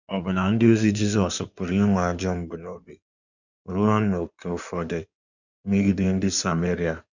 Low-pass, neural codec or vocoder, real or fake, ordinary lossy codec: 7.2 kHz; codec, 16 kHz in and 24 kHz out, 2.2 kbps, FireRedTTS-2 codec; fake; none